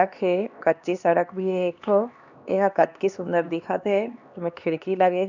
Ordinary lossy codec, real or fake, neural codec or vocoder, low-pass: none; fake; codec, 16 kHz, 2 kbps, X-Codec, HuBERT features, trained on LibriSpeech; 7.2 kHz